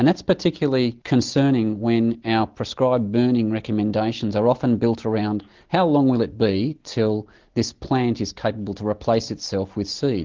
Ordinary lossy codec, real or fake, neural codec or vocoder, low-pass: Opus, 16 kbps; real; none; 7.2 kHz